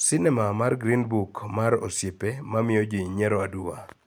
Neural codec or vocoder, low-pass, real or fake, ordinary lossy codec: none; none; real; none